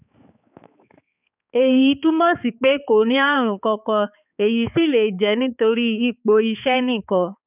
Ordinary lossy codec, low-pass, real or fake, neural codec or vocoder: none; 3.6 kHz; fake; codec, 16 kHz, 4 kbps, X-Codec, HuBERT features, trained on balanced general audio